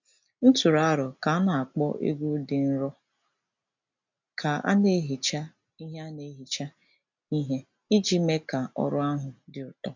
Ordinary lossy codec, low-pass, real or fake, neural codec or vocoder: MP3, 64 kbps; 7.2 kHz; real; none